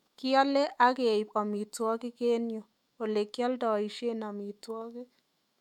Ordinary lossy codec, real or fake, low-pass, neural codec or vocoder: MP3, 96 kbps; fake; 19.8 kHz; autoencoder, 48 kHz, 128 numbers a frame, DAC-VAE, trained on Japanese speech